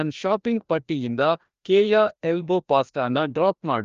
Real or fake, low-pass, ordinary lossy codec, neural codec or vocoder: fake; 7.2 kHz; Opus, 32 kbps; codec, 16 kHz, 1 kbps, FreqCodec, larger model